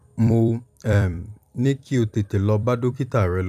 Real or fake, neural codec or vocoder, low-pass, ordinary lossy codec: fake; vocoder, 44.1 kHz, 128 mel bands every 256 samples, BigVGAN v2; 14.4 kHz; none